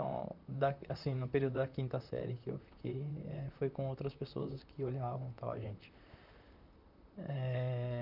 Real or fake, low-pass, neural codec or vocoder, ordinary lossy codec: fake; 5.4 kHz; vocoder, 44.1 kHz, 128 mel bands, Pupu-Vocoder; none